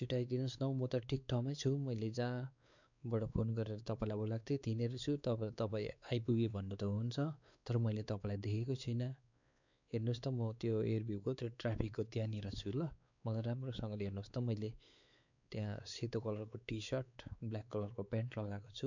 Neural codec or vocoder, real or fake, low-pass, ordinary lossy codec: codec, 16 kHz, 4 kbps, X-Codec, WavLM features, trained on Multilingual LibriSpeech; fake; 7.2 kHz; none